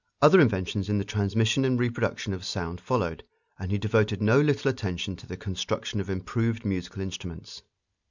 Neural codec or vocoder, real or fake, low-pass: none; real; 7.2 kHz